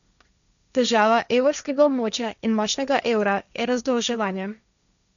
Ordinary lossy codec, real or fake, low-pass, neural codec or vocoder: none; fake; 7.2 kHz; codec, 16 kHz, 1.1 kbps, Voila-Tokenizer